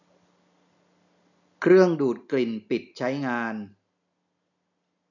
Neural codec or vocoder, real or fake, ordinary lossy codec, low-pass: none; real; none; 7.2 kHz